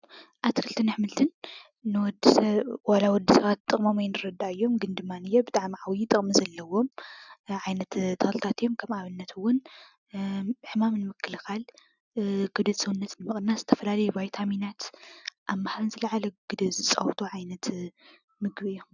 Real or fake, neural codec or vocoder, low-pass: real; none; 7.2 kHz